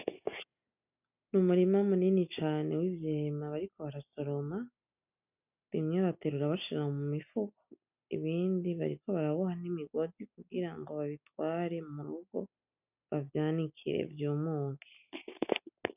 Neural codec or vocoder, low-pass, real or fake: none; 3.6 kHz; real